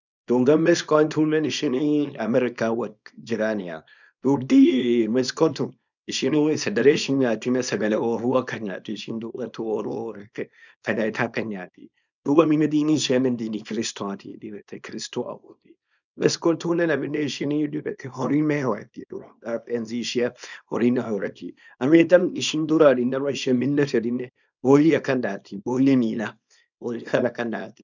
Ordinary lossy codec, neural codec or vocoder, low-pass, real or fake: none; codec, 24 kHz, 0.9 kbps, WavTokenizer, small release; 7.2 kHz; fake